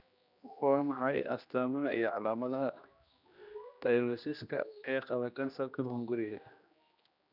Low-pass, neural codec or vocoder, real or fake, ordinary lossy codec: 5.4 kHz; codec, 16 kHz, 1 kbps, X-Codec, HuBERT features, trained on general audio; fake; none